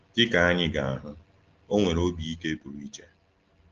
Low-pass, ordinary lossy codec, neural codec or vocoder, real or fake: 7.2 kHz; Opus, 16 kbps; none; real